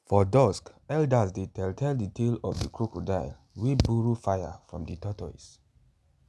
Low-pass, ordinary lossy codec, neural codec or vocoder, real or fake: none; none; none; real